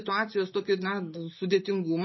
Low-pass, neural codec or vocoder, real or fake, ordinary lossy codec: 7.2 kHz; none; real; MP3, 24 kbps